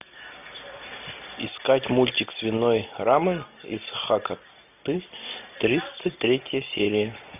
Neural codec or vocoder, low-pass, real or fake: none; 3.6 kHz; real